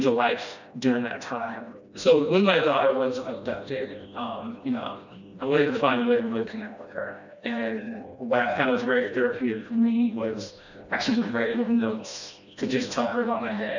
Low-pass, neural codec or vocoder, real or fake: 7.2 kHz; codec, 16 kHz, 1 kbps, FreqCodec, smaller model; fake